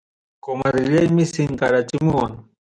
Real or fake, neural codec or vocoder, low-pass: real; none; 9.9 kHz